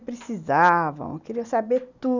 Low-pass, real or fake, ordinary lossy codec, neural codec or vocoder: 7.2 kHz; real; none; none